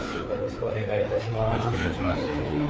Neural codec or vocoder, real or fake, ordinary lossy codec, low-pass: codec, 16 kHz, 4 kbps, FreqCodec, larger model; fake; none; none